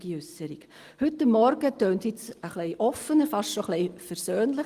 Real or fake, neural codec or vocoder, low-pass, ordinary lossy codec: real; none; 14.4 kHz; Opus, 24 kbps